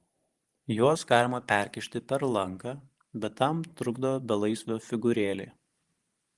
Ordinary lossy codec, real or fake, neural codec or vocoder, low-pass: Opus, 24 kbps; fake; vocoder, 44.1 kHz, 128 mel bands every 512 samples, BigVGAN v2; 10.8 kHz